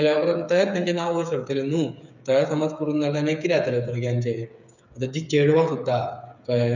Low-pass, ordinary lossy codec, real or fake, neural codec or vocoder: 7.2 kHz; none; fake; codec, 16 kHz, 8 kbps, FreqCodec, smaller model